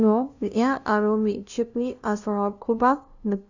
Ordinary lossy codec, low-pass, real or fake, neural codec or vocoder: none; 7.2 kHz; fake; codec, 16 kHz, 0.5 kbps, FunCodec, trained on LibriTTS, 25 frames a second